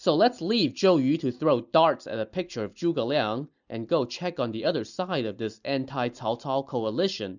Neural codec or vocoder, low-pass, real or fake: none; 7.2 kHz; real